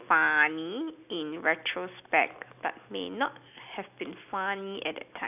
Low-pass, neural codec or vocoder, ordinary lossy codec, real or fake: 3.6 kHz; none; none; real